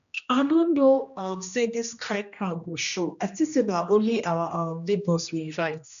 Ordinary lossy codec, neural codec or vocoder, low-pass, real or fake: none; codec, 16 kHz, 1 kbps, X-Codec, HuBERT features, trained on general audio; 7.2 kHz; fake